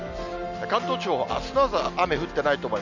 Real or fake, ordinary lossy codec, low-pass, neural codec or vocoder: real; none; 7.2 kHz; none